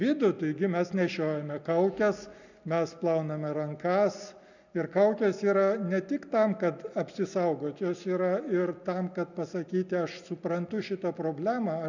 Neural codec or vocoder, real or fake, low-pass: none; real; 7.2 kHz